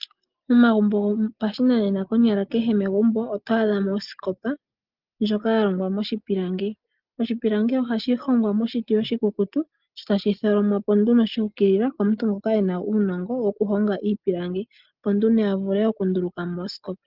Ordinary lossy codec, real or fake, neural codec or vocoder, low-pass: Opus, 32 kbps; real; none; 5.4 kHz